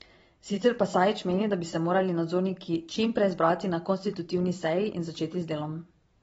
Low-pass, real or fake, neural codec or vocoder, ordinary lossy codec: 19.8 kHz; fake; vocoder, 44.1 kHz, 128 mel bands every 512 samples, BigVGAN v2; AAC, 24 kbps